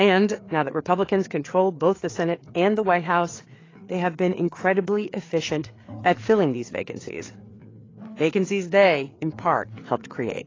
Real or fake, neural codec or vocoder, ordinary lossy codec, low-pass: fake; codec, 16 kHz, 4 kbps, FreqCodec, larger model; AAC, 32 kbps; 7.2 kHz